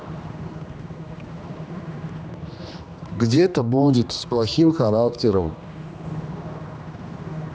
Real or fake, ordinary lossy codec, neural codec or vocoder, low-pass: fake; none; codec, 16 kHz, 2 kbps, X-Codec, HuBERT features, trained on general audio; none